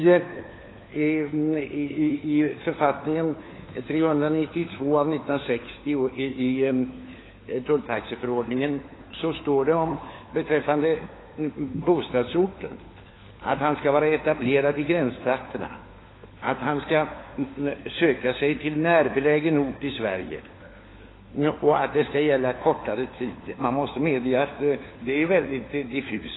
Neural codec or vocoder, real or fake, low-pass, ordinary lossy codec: codec, 16 kHz, 4 kbps, FunCodec, trained on LibriTTS, 50 frames a second; fake; 7.2 kHz; AAC, 16 kbps